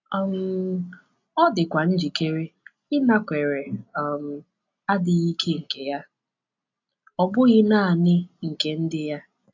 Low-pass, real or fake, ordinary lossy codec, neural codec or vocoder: 7.2 kHz; real; AAC, 48 kbps; none